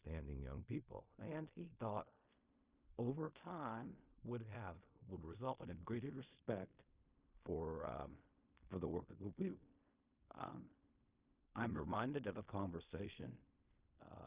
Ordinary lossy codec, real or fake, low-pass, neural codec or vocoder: Opus, 64 kbps; fake; 3.6 kHz; codec, 16 kHz in and 24 kHz out, 0.4 kbps, LongCat-Audio-Codec, fine tuned four codebook decoder